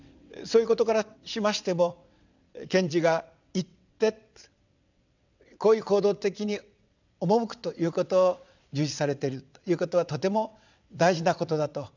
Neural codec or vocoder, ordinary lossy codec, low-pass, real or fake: vocoder, 22.05 kHz, 80 mel bands, WaveNeXt; none; 7.2 kHz; fake